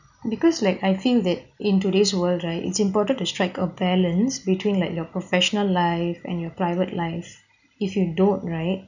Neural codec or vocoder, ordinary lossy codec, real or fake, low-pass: none; none; real; 7.2 kHz